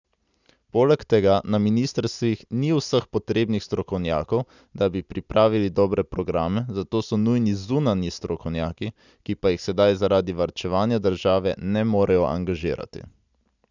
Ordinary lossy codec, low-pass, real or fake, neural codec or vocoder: none; 7.2 kHz; real; none